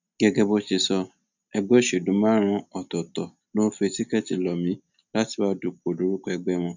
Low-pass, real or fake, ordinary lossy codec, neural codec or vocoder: 7.2 kHz; real; none; none